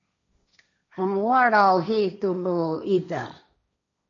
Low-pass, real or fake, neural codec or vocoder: 7.2 kHz; fake; codec, 16 kHz, 1.1 kbps, Voila-Tokenizer